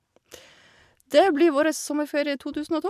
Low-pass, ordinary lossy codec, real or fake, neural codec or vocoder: 14.4 kHz; none; real; none